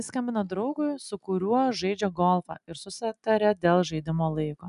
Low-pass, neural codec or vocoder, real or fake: 10.8 kHz; none; real